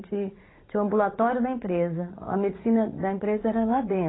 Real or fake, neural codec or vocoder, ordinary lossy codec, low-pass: fake; vocoder, 44.1 kHz, 80 mel bands, Vocos; AAC, 16 kbps; 7.2 kHz